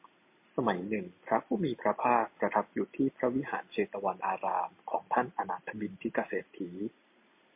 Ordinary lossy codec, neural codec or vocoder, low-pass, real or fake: MP3, 32 kbps; none; 3.6 kHz; real